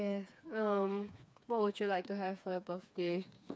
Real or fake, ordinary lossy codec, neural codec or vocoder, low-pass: fake; none; codec, 16 kHz, 4 kbps, FreqCodec, smaller model; none